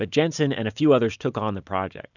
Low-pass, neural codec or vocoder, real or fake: 7.2 kHz; none; real